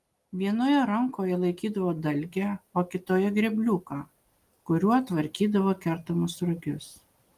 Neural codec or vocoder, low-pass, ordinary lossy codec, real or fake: none; 14.4 kHz; Opus, 32 kbps; real